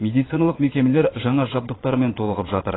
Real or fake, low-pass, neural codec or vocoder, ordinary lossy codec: fake; 7.2 kHz; vocoder, 22.05 kHz, 80 mel bands, Vocos; AAC, 16 kbps